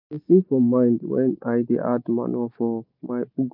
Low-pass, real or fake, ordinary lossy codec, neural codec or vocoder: 5.4 kHz; real; none; none